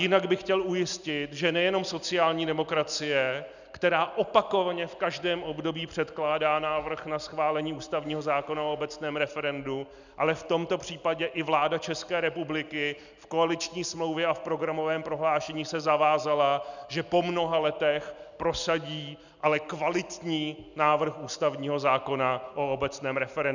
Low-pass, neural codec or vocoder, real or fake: 7.2 kHz; none; real